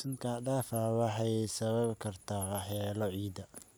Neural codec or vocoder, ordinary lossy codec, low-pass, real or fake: none; none; none; real